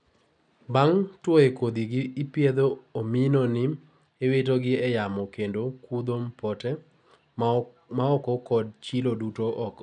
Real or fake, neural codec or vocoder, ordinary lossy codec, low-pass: real; none; none; 9.9 kHz